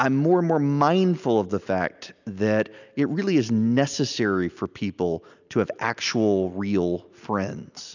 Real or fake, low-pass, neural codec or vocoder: real; 7.2 kHz; none